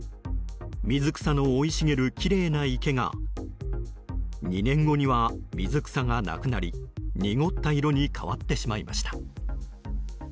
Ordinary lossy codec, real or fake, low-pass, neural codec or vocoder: none; real; none; none